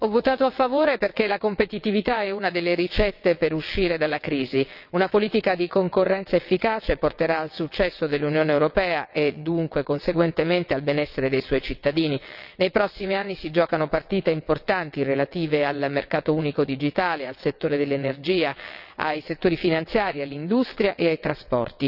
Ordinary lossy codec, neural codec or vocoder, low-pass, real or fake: none; vocoder, 22.05 kHz, 80 mel bands, WaveNeXt; 5.4 kHz; fake